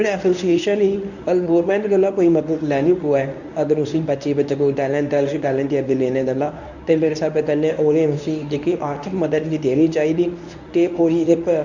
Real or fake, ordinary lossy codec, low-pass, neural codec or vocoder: fake; none; 7.2 kHz; codec, 24 kHz, 0.9 kbps, WavTokenizer, medium speech release version 1